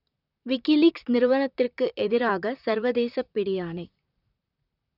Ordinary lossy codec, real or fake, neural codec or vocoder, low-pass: none; fake; vocoder, 44.1 kHz, 128 mel bands, Pupu-Vocoder; 5.4 kHz